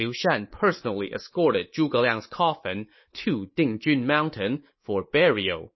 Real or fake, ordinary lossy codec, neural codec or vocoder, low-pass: fake; MP3, 24 kbps; codec, 24 kHz, 3.1 kbps, DualCodec; 7.2 kHz